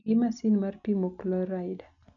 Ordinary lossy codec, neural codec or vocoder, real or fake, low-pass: none; none; real; 7.2 kHz